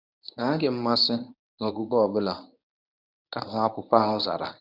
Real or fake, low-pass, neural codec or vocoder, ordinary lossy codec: fake; 5.4 kHz; codec, 24 kHz, 0.9 kbps, WavTokenizer, medium speech release version 1; none